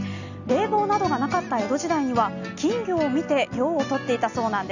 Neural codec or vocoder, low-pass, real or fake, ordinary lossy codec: none; 7.2 kHz; real; none